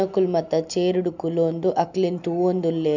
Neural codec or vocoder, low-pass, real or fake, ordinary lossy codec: none; 7.2 kHz; real; none